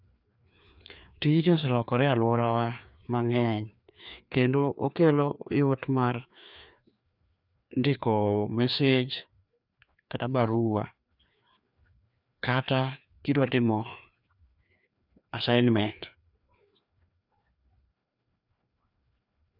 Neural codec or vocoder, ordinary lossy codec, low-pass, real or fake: codec, 16 kHz, 2 kbps, FreqCodec, larger model; none; 5.4 kHz; fake